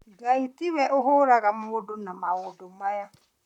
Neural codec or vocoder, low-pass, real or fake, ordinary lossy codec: vocoder, 44.1 kHz, 128 mel bands, Pupu-Vocoder; 19.8 kHz; fake; none